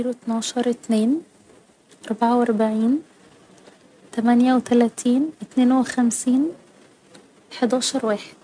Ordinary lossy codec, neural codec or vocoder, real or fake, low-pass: none; none; real; 9.9 kHz